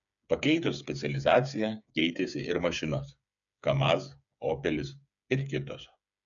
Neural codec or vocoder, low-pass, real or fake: codec, 16 kHz, 8 kbps, FreqCodec, smaller model; 7.2 kHz; fake